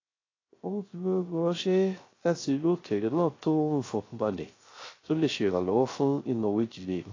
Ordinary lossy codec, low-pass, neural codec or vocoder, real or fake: AAC, 32 kbps; 7.2 kHz; codec, 16 kHz, 0.3 kbps, FocalCodec; fake